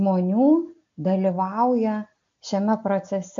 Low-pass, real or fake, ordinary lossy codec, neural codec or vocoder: 7.2 kHz; real; MP3, 48 kbps; none